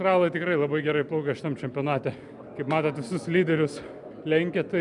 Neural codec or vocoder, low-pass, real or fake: none; 10.8 kHz; real